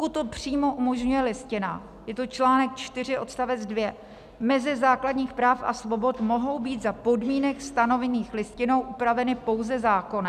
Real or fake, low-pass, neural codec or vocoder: real; 14.4 kHz; none